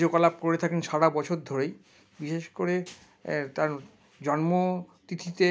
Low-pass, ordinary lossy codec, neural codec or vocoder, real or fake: none; none; none; real